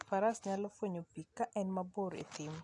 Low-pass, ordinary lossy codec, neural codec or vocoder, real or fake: 10.8 kHz; none; none; real